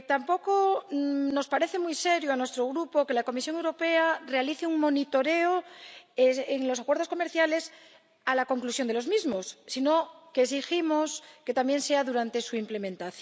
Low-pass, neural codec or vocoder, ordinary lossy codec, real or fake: none; none; none; real